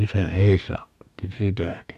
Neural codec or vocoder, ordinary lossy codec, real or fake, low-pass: codec, 44.1 kHz, 2.6 kbps, DAC; none; fake; 14.4 kHz